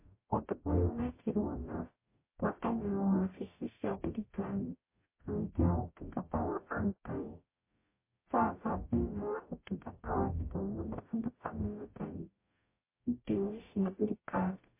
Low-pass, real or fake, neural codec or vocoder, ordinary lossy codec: 3.6 kHz; fake; codec, 44.1 kHz, 0.9 kbps, DAC; MP3, 24 kbps